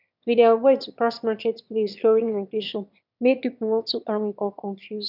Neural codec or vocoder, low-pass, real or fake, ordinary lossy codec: autoencoder, 22.05 kHz, a latent of 192 numbers a frame, VITS, trained on one speaker; 5.4 kHz; fake; none